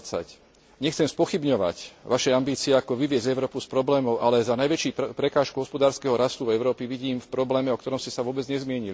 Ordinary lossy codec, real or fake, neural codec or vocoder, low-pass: none; real; none; none